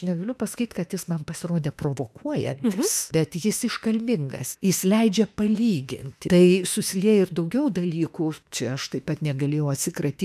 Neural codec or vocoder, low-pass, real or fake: autoencoder, 48 kHz, 32 numbers a frame, DAC-VAE, trained on Japanese speech; 14.4 kHz; fake